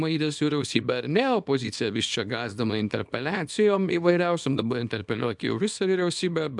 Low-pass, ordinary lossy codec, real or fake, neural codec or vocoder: 10.8 kHz; MP3, 96 kbps; fake; codec, 24 kHz, 0.9 kbps, WavTokenizer, small release